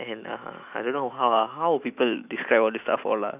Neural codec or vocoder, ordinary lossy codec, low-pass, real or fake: autoencoder, 48 kHz, 128 numbers a frame, DAC-VAE, trained on Japanese speech; none; 3.6 kHz; fake